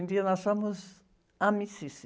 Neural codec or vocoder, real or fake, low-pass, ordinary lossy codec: none; real; none; none